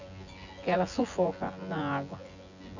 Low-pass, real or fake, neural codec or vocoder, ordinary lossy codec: 7.2 kHz; fake; vocoder, 24 kHz, 100 mel bands, Vocos; none